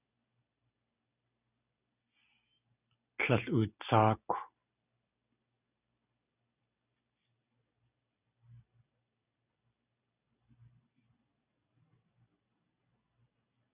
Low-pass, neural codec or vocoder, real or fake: 3.6 kHz; none; real